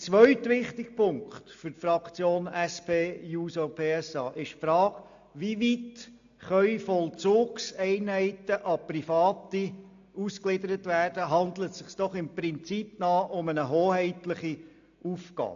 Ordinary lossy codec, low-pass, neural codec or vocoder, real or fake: AAC, 64 kbps; 7.2 kHz; none; real